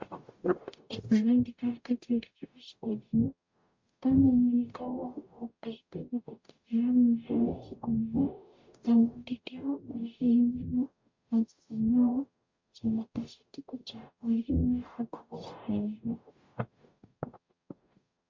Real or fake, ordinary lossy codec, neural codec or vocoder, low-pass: fake; MP3, 48 kbps; codec, 44.1 kHz, 0.9 kbps, DAC; 7.2 kHz